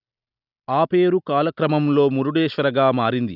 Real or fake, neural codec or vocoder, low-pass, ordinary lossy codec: real; none; 5.4 kHz; none